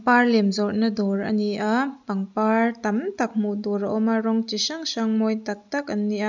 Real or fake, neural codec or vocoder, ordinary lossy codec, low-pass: real; none; none; 7.2 kHz